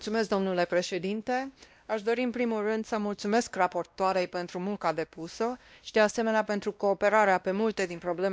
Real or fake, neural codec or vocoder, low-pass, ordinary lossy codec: fake; codec, 16 kHz, 1 kbps, X-Codec, WavLM features, trained on Multilingual LibriSpeech; none; none